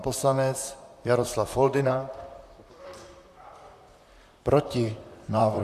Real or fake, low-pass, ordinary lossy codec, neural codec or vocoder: fake; 14.4 kHz; MP3, 96 kbps; vocoder, 44.1 kHz, 128 mel bands, Pupu-Vocoder